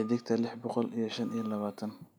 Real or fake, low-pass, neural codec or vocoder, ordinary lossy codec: real; none; none; none